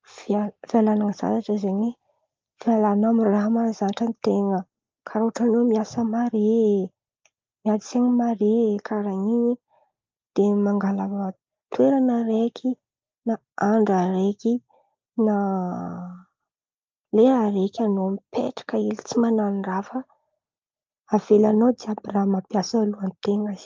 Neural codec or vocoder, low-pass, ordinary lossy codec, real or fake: none; 7.2 kHz; Opus, 24 kbps; real